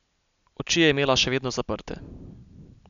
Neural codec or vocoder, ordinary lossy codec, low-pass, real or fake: none; none; 7.2 kHz; real